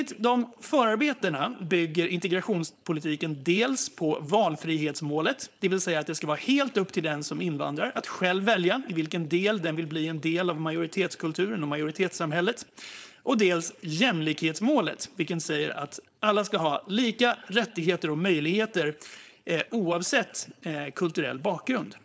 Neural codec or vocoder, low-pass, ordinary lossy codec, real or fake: codec, 16 kHz, 4.8 kbps, FACodec; none; none; fake